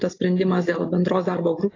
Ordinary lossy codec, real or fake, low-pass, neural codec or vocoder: AAC, 32 kbps; real; 7.2 kHz; none